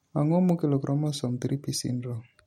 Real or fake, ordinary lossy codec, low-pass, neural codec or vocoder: fake; MP3, 64 kbps; 19.8 kHz; vocoder, 44.1 kHz, 128 mel bands every 256 samples, BigVGAN v2